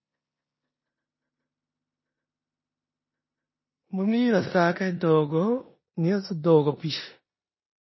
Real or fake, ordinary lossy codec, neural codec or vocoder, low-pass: fake; MP3, 24 kbps; codec, 16 kHz in and 24 kHz out, 0.9 kbps, LongCat-Audio-Codec, four codebook decoder; 7.2 kHz